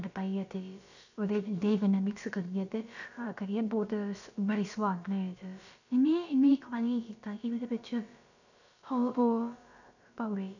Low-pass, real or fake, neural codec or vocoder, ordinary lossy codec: 7.2 kHz; fake; codec, 16 kHz, about 1 kbps, DyCAST, with the encoder's durations; none